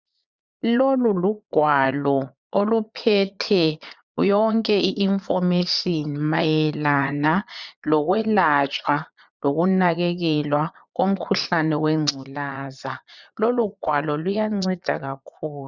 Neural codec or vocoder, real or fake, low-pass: vocoder, 22.05 kHz, 80 mel bands, Vocos; fake; 7.2 kHz